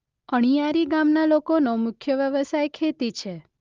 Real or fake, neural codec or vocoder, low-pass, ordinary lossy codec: real; none; 7.2 kHz; Opus, 32 kbps